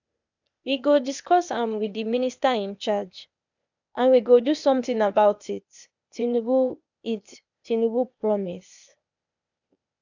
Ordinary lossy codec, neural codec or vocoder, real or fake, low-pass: none; codec, 16 kHz, 0.8 kbps, ZipCodec; fake; 7.2 kHz